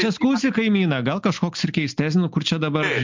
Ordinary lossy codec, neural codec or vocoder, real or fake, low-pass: MP3, 64 kbps; none; real; 7.2 kHz